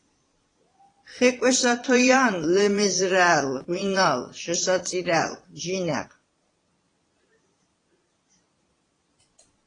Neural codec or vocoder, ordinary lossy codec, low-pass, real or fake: vocoder, 22.05 kHz, 80 mel bands, Vocos; AAC, 32 kbps; 9.9 kHz; fake